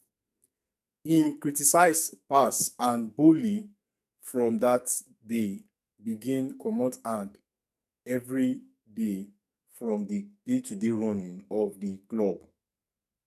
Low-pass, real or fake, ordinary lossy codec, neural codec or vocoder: 14.4 kHz; fake; none; codec, 44.1 kHz, 2.6 kbps, SNAC